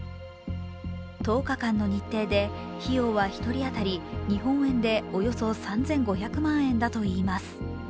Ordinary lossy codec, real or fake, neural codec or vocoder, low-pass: none; real; none; none